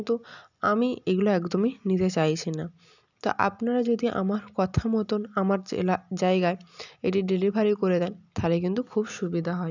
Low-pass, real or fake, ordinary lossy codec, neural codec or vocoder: 7.2 kHz; real; none; none